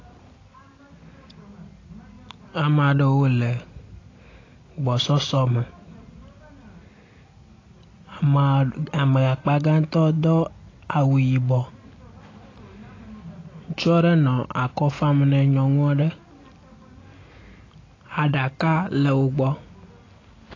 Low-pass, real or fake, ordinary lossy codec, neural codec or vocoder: 7.2 kHz; real; AAC, 32 kbps; none